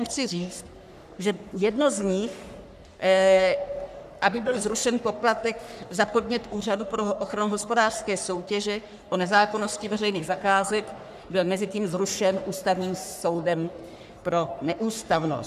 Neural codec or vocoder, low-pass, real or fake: codec, 44.1 kHz, 3.4 kbps, Pupu-Codec; 14.4 kHz; fake